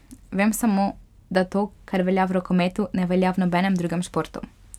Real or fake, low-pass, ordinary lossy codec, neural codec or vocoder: real; 19.8 kHz; none; none